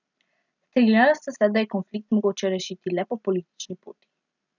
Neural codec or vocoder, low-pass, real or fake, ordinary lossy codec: none; 7.2 kHz; real; none